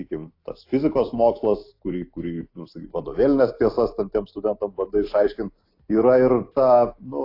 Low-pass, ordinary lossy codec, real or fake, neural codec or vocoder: 5.4 kHz; AAC, 32 kbps; real; none